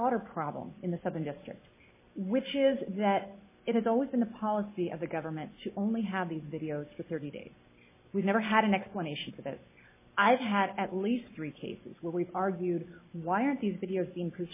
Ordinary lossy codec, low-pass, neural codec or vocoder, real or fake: MP3, 16 kbps; 3.6 kHz; none; real